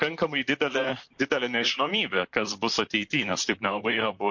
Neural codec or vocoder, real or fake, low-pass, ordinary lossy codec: vocoder, 44.1 kHz, 128 mel bands, Pupu-Vocoder; fake; 7.2 kHz; MP3, 48 kbps